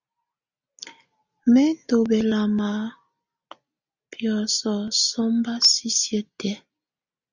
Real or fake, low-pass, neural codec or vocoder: real; 7.2 kHz; none